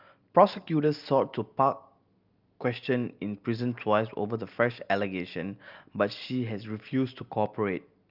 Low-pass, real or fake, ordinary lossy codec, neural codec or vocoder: 5.4 kHz; real; Opus, 24 kbps; none